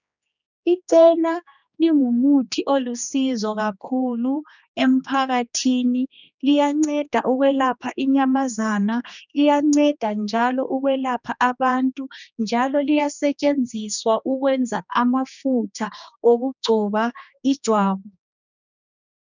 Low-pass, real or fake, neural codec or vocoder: 7.2 kHz; fake; codec, 16 kHz, 2 kbps, X-Codec, HuBERT features, trained on general audio